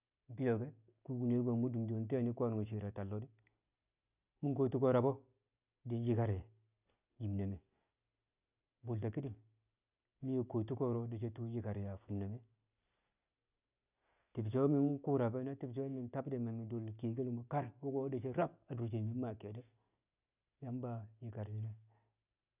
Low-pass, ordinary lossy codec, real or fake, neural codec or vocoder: 3.6 kHz; none; real; none